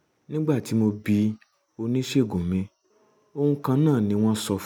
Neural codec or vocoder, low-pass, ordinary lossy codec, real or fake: none; 19.8 kHz; none; real